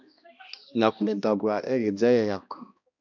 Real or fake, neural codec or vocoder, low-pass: fake; codec, 16 kHz, 1 kbps, X-Codec, HuBERT features, trained on balanced general audio; 7.2 kHz